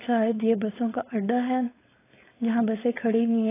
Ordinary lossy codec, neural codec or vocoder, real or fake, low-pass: AAC, 16 kbps; codec, 16 kHz, 4.8 kbps, FACodec; fake; 3.6 kHz